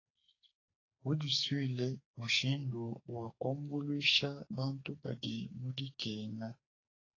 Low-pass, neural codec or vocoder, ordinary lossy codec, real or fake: 7.2 kHz; codec, 44.1 kHz, 2.6 kbps, SNAC; AAC, 32 kbps; fake